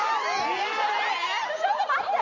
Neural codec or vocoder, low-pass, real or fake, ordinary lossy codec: none; 7.2 kHz; real; none